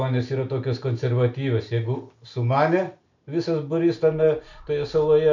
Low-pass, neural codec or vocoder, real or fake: 7.2 kHz; none; real